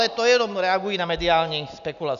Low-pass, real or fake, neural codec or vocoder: 7.2 kHz; real; none